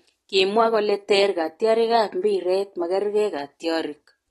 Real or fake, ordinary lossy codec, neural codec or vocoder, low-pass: real; AAC, 32 kbps; none; 19.8 kHz